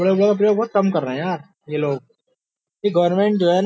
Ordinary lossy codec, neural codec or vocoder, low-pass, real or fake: none; none; none; real